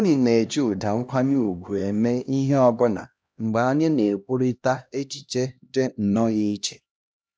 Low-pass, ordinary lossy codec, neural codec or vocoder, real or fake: none; none; codec, 16 kHz, 1 kbps, X-Codec, HuBERT features, trained on LibriSpeech; fake